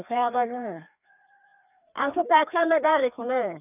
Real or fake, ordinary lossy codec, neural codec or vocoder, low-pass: fake; none; codec, 16 kHz, 2 kbps, FreqCodec, larger model; 3.6 kHz